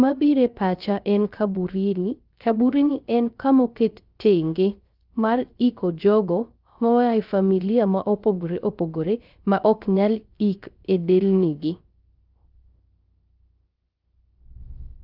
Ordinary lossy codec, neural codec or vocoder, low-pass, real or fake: Opus, 24 kbps; codec, 16 kHz, 0.3 kbps, FocalCodec; 5.4 kHz; fake